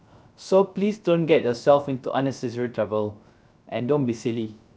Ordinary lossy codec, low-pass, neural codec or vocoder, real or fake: none; none; codec, 16 kHz, 0.3 kbps, FocalCodec; fake